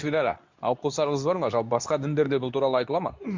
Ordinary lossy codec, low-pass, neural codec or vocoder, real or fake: MP3, 64 kbps; 7.2 kHz; codec, 24 kHz, 0.9 kbps, WavTokenizer, medium speech release version 2; fake